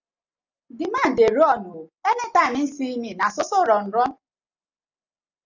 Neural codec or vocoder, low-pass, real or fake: none; 7.2 kHz; real